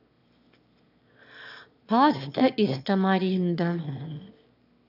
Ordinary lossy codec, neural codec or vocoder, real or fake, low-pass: none; autoencoder, 22.05 kHz, a latent of 192 numbers a frame, VITS, trained on one speaker; fake; 5.4 kHz